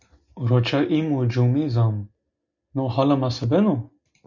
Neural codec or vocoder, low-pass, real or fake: none; 7.2 kHz; real